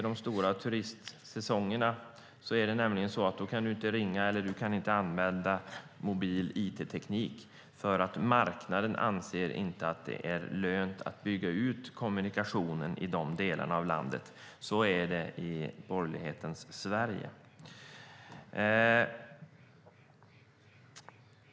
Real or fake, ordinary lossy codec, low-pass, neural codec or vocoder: real; none; none; none